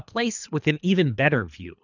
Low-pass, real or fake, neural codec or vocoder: 7.2 kHz; fake; codec, 24 kHz, 3 kbps, HILCodec